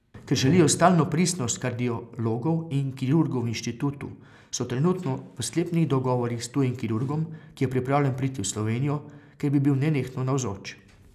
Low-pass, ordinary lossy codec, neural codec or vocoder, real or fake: 14.4 kHz; none; none; real